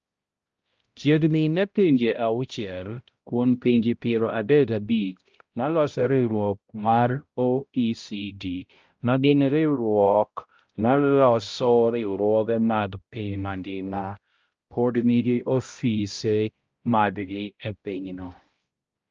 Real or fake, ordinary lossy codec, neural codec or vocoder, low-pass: fake; Opus, 24 kbps; codec, 16 kHz, 0.5 kbps, X-Codec, HuBERT features, trained on balanced general audio; 7.2 kHz